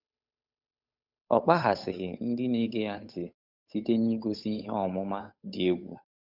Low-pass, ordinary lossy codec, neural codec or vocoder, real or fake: 5.4 kHz; none; codec, 16 kHz, 8 kbps, FunCodec, trained on Chinese and English, 25 frames a second; fake